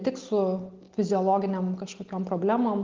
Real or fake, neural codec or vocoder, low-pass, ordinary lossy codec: real; none; 7.2 kHz; Opus, 16 kbps